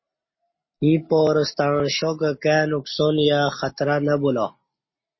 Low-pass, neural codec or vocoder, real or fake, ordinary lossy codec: 7.2 kHz; none; real; MP3, 24 kbps